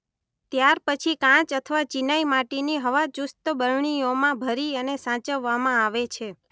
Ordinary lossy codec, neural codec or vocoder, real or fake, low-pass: none; none; real; none